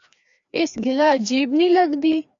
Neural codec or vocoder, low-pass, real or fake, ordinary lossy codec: codec, 16 kHz, 2 kbps, FreqCodec, larger model; 7.2 kHz; fake; AAC, 64 kbps